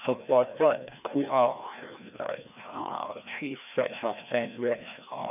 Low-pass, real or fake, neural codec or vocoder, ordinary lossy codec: 3.6 kHz; fake; codec, 16 kHz, 1 kbps, FreqCodec, larger model; none